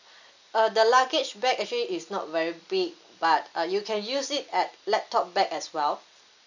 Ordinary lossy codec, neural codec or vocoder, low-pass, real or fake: none; none; 7.2 kHz; real